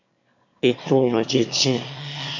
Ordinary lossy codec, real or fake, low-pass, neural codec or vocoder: MP3, 48 kbps; fake; 7.2 kHz; autoencoder, 22.05 kHz, a latent of 192 numbers a frame, VITS, trained on one speaker